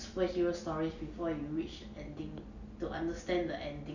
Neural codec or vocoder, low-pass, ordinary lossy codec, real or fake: none; 7.2 kHz; none; real